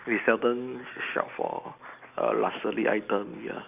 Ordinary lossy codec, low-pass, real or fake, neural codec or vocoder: none; 3.6 kHz; real; none